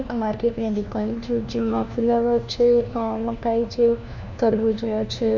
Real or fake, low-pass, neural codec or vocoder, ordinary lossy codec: fake; 7.2 kHz; codec, 16 kHz, 1 kbps, FunCodec, trained on LibriTTS, 50 frames a second; none